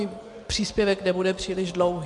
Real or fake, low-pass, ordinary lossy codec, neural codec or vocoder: real; 10.8 kHz; MP3, 48 kbps; none